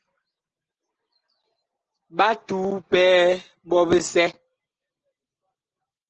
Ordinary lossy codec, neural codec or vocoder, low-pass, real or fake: Opus, 16 kbps; none; 7.2 kHz; real